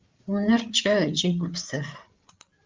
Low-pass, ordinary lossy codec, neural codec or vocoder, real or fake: 7.2 kHz; Opus, 32 kbps; codec, 16 kHz, 8 kbps, FreqCodec, larger model; fake